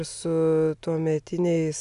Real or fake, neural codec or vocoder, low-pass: real; none; 10.8 kHz